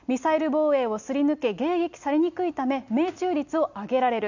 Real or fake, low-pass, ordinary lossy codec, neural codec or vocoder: real; 7.2 kHz; none; none